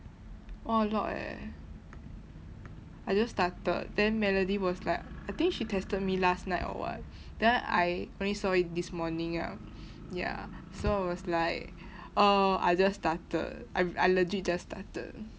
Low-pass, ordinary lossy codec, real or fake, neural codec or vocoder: none; none; real; none